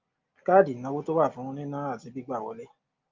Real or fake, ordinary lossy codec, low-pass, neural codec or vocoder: real; Opus, 24 kbps; 7.2 kHz; none